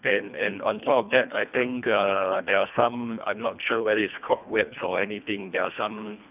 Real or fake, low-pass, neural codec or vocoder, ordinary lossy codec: fake; 3.6 kHz; codec, 24 kHz, 1.5 kbps, HILCodec; none